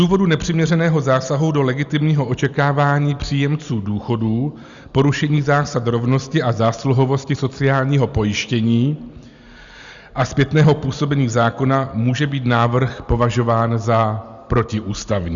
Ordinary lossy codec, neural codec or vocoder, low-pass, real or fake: Opus, 64 kbps; none; 7.2 kHz; real